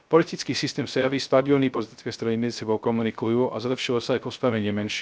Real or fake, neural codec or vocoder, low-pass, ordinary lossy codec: fake; codec, 16 kHz, 0.3 kbps, FocalCodec; none; none